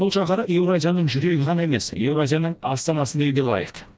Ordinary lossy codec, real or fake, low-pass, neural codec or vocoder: none; fake; none; codec, 16 kHz, 1 kbps, FreqCodec, smaller model